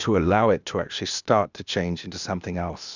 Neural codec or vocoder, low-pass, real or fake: codec, 16 kHz, 0.8 kbps, ZipCodec; 7.2 kHz; fake